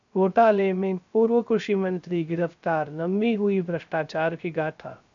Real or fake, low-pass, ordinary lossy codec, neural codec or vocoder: fake; 7.2 kHz; AAC, 64 kbps; codec, 16 kHz, 0.3 kbps, FocalCodec